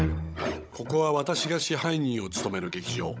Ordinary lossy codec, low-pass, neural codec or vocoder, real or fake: none; none; codec, 16 kHz, 16 kbps, FunCodec, trained on Chinese and English, 50 frames a second; fake